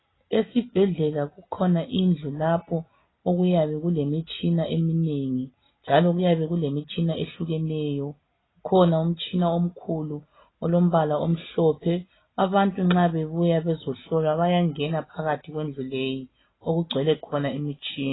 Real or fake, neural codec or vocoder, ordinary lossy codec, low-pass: real; none; AAC, 16 kbps; 7.2 kHz